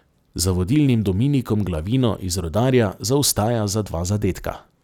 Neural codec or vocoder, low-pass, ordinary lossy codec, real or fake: none; 19.8 kHz; none; real